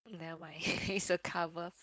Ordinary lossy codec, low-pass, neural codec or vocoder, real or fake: none; none; codec, 16 kHz, 4.8 kbps, FACodec; fake